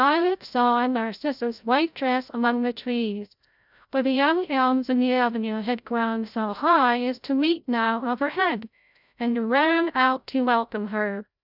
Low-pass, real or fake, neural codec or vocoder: 5.4 kHz; fake; codec, 16 kHz, 0.5 kbps, FreqCodec, larger model